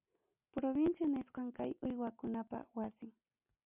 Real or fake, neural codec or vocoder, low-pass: real; none; 3.6 kHz